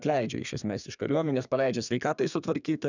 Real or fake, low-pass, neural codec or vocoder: fake; 7.2 kHz; codec, 32 kHz, 1.9 kbps, SNAC